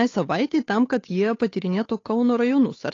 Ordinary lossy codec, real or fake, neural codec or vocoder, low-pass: AAC, 32 kbps; fake; codec, 16 kHz, 8 kbps, FunCodec, trained on Chinese and English, 25 frames a second; 7.2 kHz